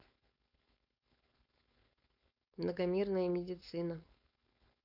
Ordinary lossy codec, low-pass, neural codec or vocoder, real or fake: none; 5.4 kHz; codec, 16 kHz, 4.8 kbps, FACodec; fake